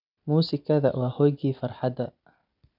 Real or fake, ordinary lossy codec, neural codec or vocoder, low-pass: real; none; none; 5.4 kHz